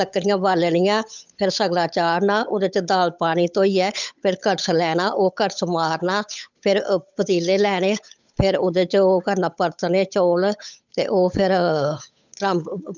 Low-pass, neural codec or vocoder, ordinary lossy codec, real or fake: 7.2 kHz; codec, 16 kHz, 8 kbps, FunCodec, trained on Chinese and English, 25 frames a second; none; fake